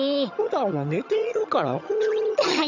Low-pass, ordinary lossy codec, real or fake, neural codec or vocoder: 7.2 kHz; none; fake; vocoder, 22.05 kHz, 80 mel bands, HiFi-GAN